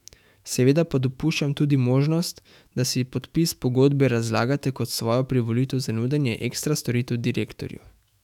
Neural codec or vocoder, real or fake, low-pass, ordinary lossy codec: autoencoder, 48 kHz, 128 numbers a frame, DAC-VAE, trained on Japanese speech; fake; 19.8 kHz; none